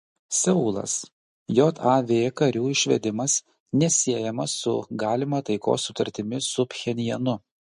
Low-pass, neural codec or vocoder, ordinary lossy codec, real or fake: 14.4 kHz; none; MP3, 48 kbps; real